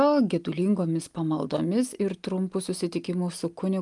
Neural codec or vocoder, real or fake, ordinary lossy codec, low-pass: none; real; Opus, 32 kbps; 10.8 kHz